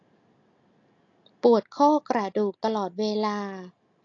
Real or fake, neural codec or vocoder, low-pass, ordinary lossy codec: real; none; 7.2 kHz; AAC, 48 kbps